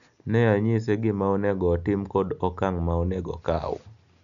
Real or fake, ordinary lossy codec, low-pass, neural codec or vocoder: real; none; 7.2 kHz; none